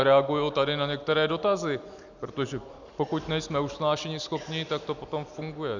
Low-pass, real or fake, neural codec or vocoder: 7.2 kHz; real; none